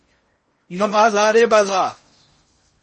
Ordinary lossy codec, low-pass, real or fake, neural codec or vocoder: MP3, 32 kbps; 10.8 kHz; fake; codec, 16 kHz in and 24 kHz out, 0.6 kbps, FocalCodec, streaming, 4096 codes